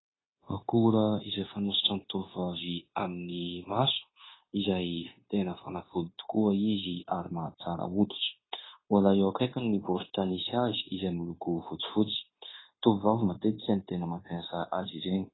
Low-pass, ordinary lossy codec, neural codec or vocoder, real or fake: 7.2 kHz; AAC, 16 kbps; codec, 16 kHz, 0.9 kbps, LongCat-Audio-Codec; fake